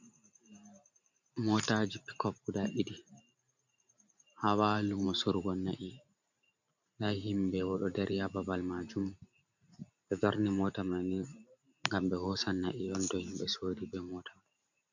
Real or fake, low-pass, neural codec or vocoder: fake; 7.2 kHz; vocoder, 44.1 kHz, 128 mel bands every 512 samples, BigVGAN v2